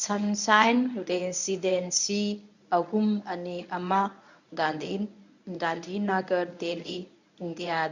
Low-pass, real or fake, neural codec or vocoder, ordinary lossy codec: 7.2 kHz; fake; codec, 24 kHz, 0.9 kbps, WavTokenizer, medium speech release version 1; none